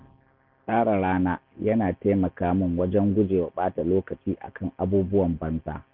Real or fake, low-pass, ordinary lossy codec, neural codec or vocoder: real; 5.4 kHz; none; none